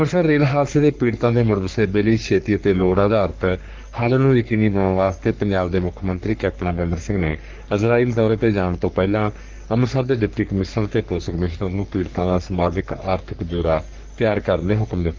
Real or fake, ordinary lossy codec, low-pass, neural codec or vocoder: fake; Opus, 24 kbps; 7.2 kHz; codec, 44.1 kHz, 3.4 kbps, Pupu-Codec